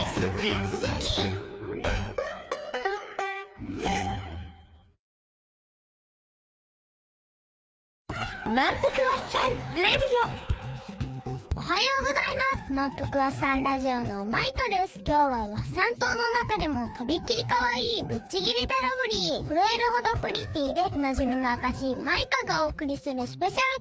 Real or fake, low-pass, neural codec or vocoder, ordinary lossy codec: fake; none; codec, 16 kHz, 2 kbps, FreqCodec, larger model; none